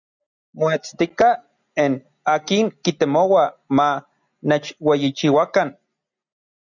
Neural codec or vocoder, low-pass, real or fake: none; 7.2 kHz; real